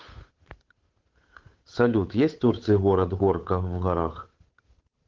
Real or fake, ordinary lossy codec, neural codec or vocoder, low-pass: fake; Opus, 16 kbps; codec, 16 kHz, 8 kbps, FunCodec, trained on Chinese and English, 25 frames a second; 7.2 kHz